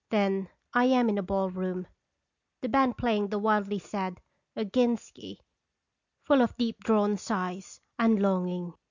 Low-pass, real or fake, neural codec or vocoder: 7.2 kHz; real; none